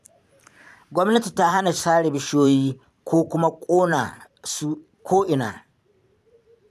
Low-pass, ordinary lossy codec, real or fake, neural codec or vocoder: 14.4 kHz; none; fake; vocoder, 44.1 kHz, 128 mel bands every 512 samples, BigVGAN v2